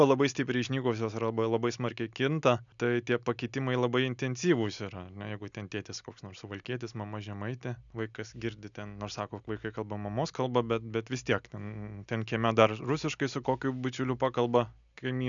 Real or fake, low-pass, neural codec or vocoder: real; 7.2 kHz; none